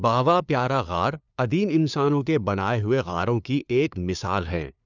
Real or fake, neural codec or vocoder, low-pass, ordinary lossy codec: fake; codec, 16 kHz, 2 kbps, FunCodec, trained on LibriTTS, 25 frames a second; 7.2 kHz; none